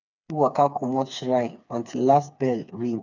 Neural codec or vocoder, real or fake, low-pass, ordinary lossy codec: codec, 16 kHz, 4 kbps, FreqCodec, smaller model; fake; 7.2 kHz; none